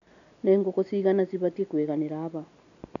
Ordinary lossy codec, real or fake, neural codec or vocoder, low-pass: none; real; none; 7.2 kHz